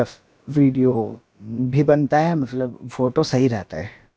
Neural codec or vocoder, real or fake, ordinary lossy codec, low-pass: codec, 16 kHz, about 1 kbps, DyCAST, with the encoder's durations; fake; none; none